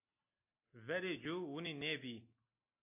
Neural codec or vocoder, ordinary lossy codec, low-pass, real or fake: none; AAC, 24 kbps; 3.6 kHz; real